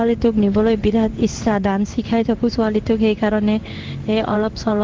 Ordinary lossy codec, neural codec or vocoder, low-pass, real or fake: Opus, 16 kbps; codec, 16 kHz in and 24 kHz out, 1 kbps, XY-Tokenizer; 7.2 kHz; fake